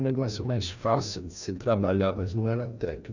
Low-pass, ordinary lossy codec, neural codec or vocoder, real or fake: 7.2 kHz; none; codec, 16 kHz, 1 kbps, FreqCodec, larger model; fake